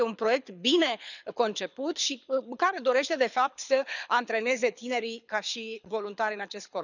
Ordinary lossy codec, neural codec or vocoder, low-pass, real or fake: none; codec, 24 kHz, 6 kbps, HILCodec; 7.2 kHz; fake